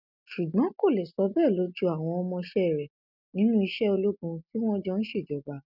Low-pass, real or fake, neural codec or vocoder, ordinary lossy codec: 5.4 kHz; real; none; none